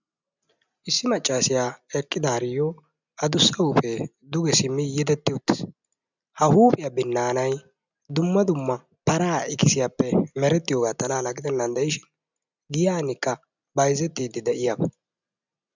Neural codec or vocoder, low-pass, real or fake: none; 7.2 kHz; real